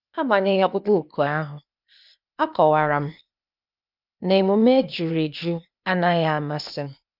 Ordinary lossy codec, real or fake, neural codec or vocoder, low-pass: none; fake; codec, 16 kHz, 0.8 kbps, ZipCodec; 5.4 kHz